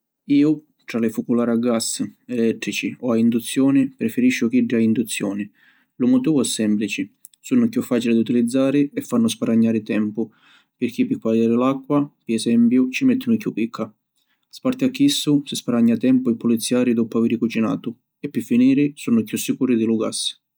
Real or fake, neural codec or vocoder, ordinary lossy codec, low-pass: real; none; none; none